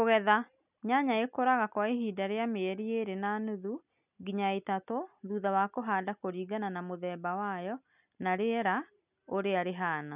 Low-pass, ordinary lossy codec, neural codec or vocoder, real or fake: 3.6 kHz; none; none; real